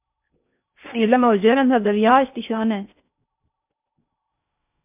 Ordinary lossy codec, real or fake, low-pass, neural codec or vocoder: MP3, 32 kbps; fake; 3.6 kHz; codec, 16 kHz in and 24 kHz out, 0.8 kbps, FocalCodec, streaming, 65536 codes